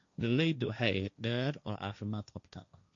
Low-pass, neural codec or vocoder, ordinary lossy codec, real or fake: 7.2 kHz; codec, 16 kHz, 1.1 kbps, Voila-Tokenizer; MP3, 96 kbps; fake